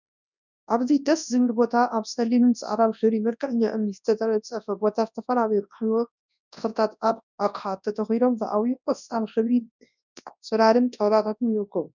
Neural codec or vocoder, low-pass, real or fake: codec, 24 kHz, 0.9 kbps, WavTokenizer, large speech release; 7.2 kHz; fake